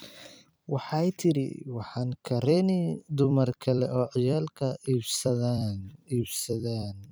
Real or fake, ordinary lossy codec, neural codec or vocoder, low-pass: fake; none; vocoder, 44.1 kHz, 128 mel bands every 256 samples, BigVGAN v2; none